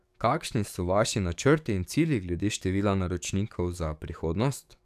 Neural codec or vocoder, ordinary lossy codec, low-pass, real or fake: codec, 44.1 kHz, 7.8 kbps, DAC; none; 14.4 kHz; fake